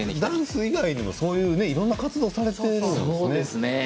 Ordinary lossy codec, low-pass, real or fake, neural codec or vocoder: none; none; real; none